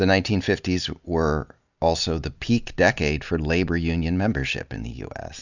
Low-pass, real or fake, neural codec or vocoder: 7.2 kHz; real; none